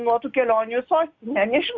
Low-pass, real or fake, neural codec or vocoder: 7.2 kHz; real; none